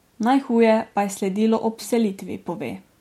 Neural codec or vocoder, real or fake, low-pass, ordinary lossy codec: none; real; 19.8 kHz; MP3, 64 kbps